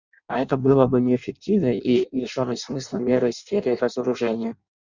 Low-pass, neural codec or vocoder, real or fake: 7.2 kHz; codec, 16 kHz in and 24 kHz out, 0.6 kbps, FireRedTTS-2 codec; fake